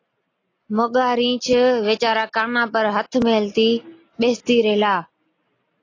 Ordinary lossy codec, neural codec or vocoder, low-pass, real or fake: AAC, 32 kbps; none; 7.2 kHz; real